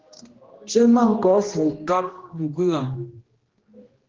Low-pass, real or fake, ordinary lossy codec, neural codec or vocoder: 7.2 kHz; fake; Opus, 16 kbps; codec, 16 kHz, 1 kbps, X-Codec, HuBERT features, trained on general audio